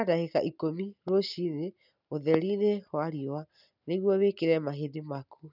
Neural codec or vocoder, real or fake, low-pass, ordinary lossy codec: none; real; 5.4 kHz; none